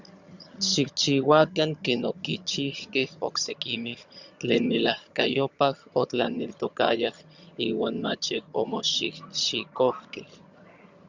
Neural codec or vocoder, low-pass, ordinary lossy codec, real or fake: vocoder, 22.05 kHz, 80 mel bands, HiFi-GAN; 7.2 kHz; Opus, 64 kbps; fake